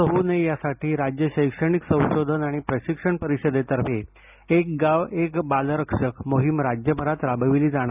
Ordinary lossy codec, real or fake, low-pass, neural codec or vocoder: none; real; 3.6 kHz; none